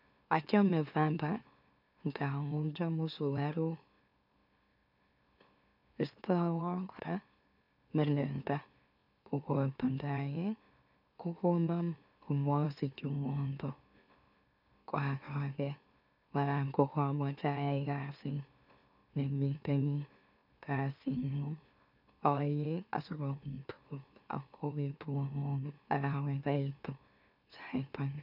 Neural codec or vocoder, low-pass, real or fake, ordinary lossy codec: autoencoder, 44.1 kHz, a latent of 192 numbers a frame, MeloTTS; 5.4 kHz; fake; none